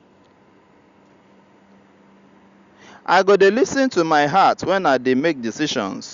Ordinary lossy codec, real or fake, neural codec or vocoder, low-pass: Opus, 64 kbps; real; none; 7.2 kHz